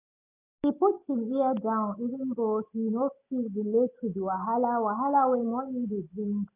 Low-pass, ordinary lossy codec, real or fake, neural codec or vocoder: 3.6 kHz; none; real; none